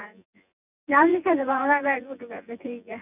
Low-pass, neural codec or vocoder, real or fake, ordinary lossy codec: 3.6 kHz; vocoder, 24 kHz, 100 mel bands, Vocos; fake; none